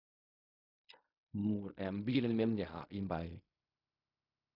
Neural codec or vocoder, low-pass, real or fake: codec, 16 kHz in and 24 kHz out, 0.4 kbps, LongCat-Audio-Codec, fine tuned four codebook decoder; 5.4 kHz; fake